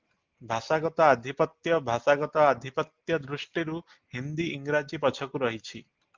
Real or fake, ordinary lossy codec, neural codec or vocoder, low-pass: real; Opus, 24 kbps; none; 7.2 kHz